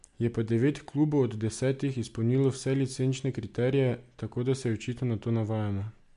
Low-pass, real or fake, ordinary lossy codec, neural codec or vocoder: 10.8 kHz; real; MP3, 64 kbps; none